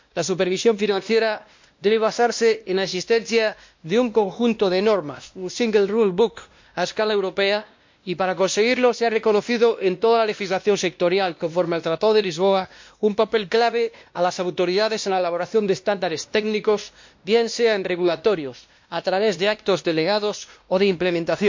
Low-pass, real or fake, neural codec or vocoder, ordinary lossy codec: 7.2 kHz; fake; codec, 16 kHz, 1 kbps, X-Codec, WavLM features, trained on Multilingual LibriSpeech; MP3, 48 kbps